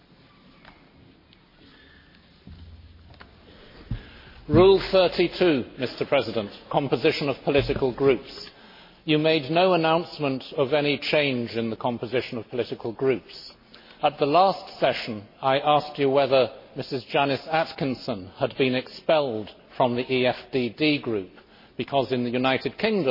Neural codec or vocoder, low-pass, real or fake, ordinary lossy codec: none; 5.4 kHz; real; MP3, 24 kbps